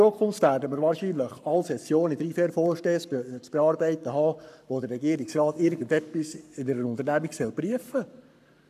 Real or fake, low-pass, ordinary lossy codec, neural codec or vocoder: fake; 14.4 kHz; none; codec, 44.1 kHz, 7.8 kbps, Pupu-Codec